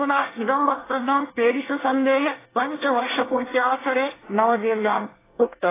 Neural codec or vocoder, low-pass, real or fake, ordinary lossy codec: codec, 24 kHz, 1 kbps, SNAC; 3.6 kHz; fake; AAC, 16 kbps